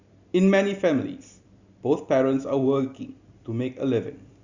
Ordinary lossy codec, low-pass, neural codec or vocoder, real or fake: Opus, 64 kbps; 7.2 kHz; none; real